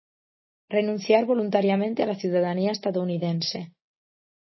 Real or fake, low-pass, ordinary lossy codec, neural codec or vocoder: real; 7.2 kHz; MP3, 24 kbps; none